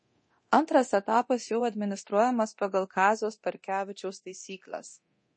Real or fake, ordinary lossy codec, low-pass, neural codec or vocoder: fake; MP3, 32 kbps; 9.9 kHz; codec, 24 kHz, 0.9 kbps, DualCodec